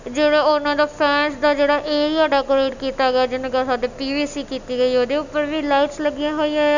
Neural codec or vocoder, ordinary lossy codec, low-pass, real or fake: none; none; 7.2 kHz; real